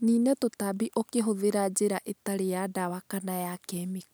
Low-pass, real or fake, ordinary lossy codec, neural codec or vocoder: none; real; none; none